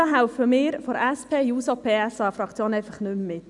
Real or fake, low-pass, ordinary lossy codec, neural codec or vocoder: real; 10.8 kHz; none; none